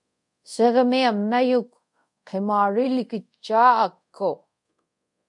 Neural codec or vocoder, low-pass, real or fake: codec, 24 kHz, 0.5 kbps, DualCodec; 10.8 kHz; fake